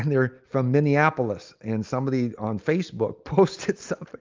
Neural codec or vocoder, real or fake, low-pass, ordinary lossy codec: none; real; 7.2 kHz; Opus, 32 kbps